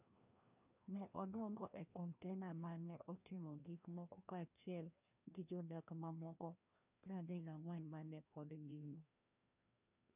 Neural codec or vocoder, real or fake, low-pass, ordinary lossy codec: codec, 16 kHz, 1 kbps, FreqCodec, larger model; fake; 3.6 kHz; none